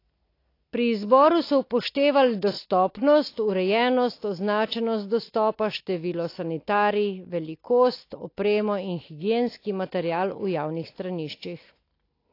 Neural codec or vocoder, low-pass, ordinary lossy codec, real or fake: none; 5.4 kHz; AAC, 32 kbps; real